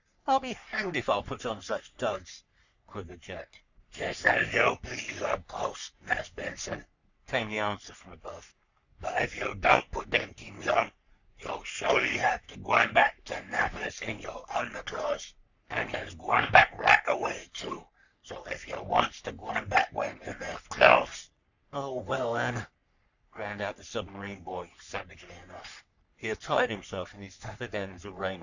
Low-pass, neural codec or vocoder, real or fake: 7.2 kHz; codec, 44.1 kHz, 3.4 kbps, Pupu-Codec; fake